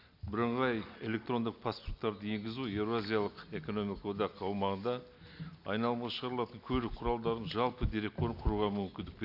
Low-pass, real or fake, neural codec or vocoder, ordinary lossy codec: 5.4 kHz; real; none; none